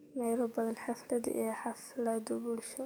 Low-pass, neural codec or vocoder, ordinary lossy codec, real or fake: none; codec, 44.1 kHz, 7.8 kbps, DAC; none; fake